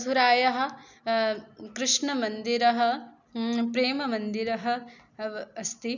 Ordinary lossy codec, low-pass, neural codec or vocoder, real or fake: none; 7.2 kHz; none; real